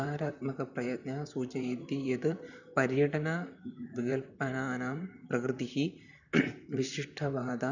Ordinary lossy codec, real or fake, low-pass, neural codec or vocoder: none; fake; 7.2 kHz; vocoder, 44.1 kHz, 128 mel bands, Pupu-Vocoder